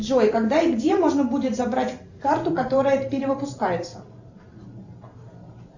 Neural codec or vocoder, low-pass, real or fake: vocoder, 44.1 kHz, 128 mel bands every 512 samples, BigVGAN v2; 7.2 kHz; fake